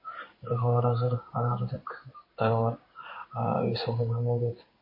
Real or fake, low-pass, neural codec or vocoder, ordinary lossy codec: fake; 5.4 kHz; codec, 16 kHz in and 24 kHz out, 1 kbps, XY-Tokenizer; MP3, 24 kbps